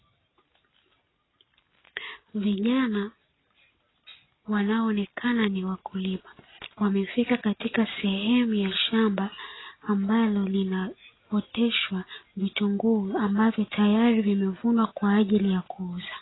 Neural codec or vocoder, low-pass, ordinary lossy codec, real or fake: none; 7.2 kHz; AAC, 16 kbps; real